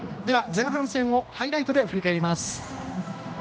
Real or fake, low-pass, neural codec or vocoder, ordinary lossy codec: fake; none; codec, 16 kHz, 1 kbps, X-Codec, HuBERT features, trained on general audio; none